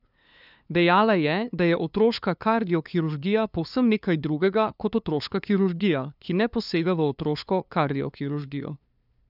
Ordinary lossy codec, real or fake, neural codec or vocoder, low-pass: none; fake; codec, 16 kHz, 2 kbps, FunCodec, trained on LibriTTS, 25 frames a second; 5.4 kHz